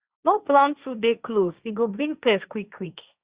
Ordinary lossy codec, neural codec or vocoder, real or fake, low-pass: Opus, 64 kbps; codec, 16 kHz, 1.1 kbps, Voila-Tokenizer; fake; 3.6 kHz